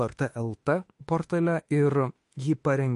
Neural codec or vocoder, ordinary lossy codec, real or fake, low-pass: autoencoder, 48 kHz, 32 numbers a frame, DAC-VAE, trained on Japanese speech; MP3, 48 kbps; fake; 14.4 kHz